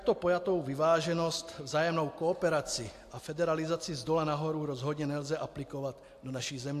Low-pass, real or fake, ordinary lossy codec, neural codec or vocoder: 14.4 kHz; real; AAC, 64 kbps; none